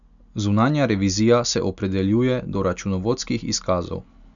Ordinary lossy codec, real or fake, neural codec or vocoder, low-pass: none; real; none; 7.2 kHz